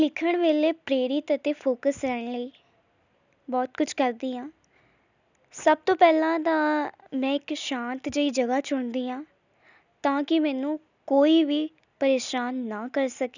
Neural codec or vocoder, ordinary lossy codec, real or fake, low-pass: none; none; real; 7.2 kHz